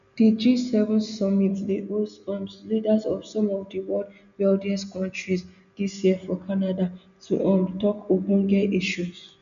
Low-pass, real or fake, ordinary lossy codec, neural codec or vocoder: 7.2 kHz; real; none; none